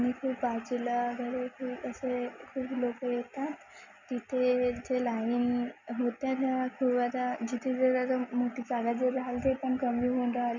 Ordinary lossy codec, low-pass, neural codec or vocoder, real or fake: none; 7.2 kHz; none; real